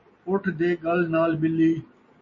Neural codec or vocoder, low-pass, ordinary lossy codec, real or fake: none; 7.2 kHz; MP3, 32 kbps; real